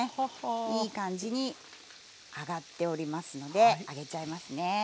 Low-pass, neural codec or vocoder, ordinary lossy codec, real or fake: none; none; none; real